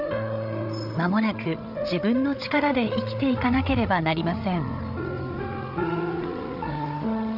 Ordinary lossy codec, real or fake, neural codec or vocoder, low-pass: none; fake; codec, 16 kHz, 8 kbps, FreqCodec, larger model; 5.4 kHz